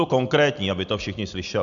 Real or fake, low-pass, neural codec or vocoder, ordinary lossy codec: real; 7.2 kHz; none; MP3, 96 kbps